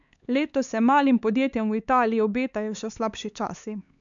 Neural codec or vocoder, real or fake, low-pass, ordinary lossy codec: codec, 16 kHz, 4 kbps, X-Codec, HuBERT features, trained on LibriSpeech; fake; 7.2 kHz; MP3, 96 kbps